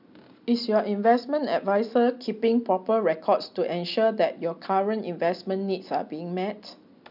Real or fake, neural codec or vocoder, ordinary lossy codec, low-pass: real; none; none; 5.4 kHz